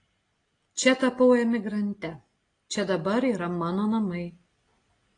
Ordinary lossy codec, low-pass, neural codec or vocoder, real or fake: AAC, 32 kbps; 9.9 kHz; none; real